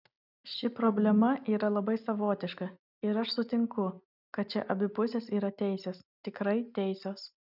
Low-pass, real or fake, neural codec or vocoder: 5.4 kHz; real; none